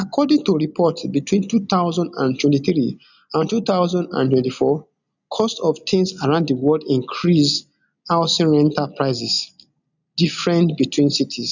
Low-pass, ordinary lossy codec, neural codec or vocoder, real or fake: 7.2 kHz; none; none; real